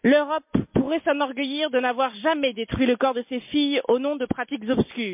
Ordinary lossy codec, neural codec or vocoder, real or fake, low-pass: MP3, 32 kbps; none; real; 3.6 kHz